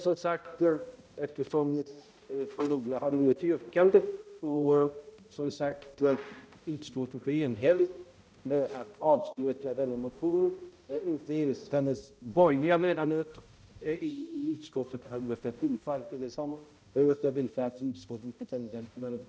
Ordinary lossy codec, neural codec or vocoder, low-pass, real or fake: none; codec, 16 kHz, 0.5 kbps, X-Codec, HuBERT features, trained on balanced general audio; none; fake